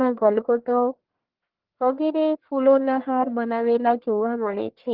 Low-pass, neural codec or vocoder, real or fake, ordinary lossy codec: 5.4 kHz; codec, 44.1 kHz, 1.7 kbps, Pupu-Codec; fake; Opus, 16 kbps